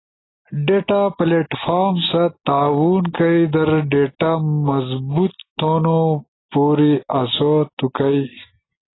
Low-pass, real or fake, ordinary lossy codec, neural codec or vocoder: 7.2 kHz; real; AAC, 16 kbps; none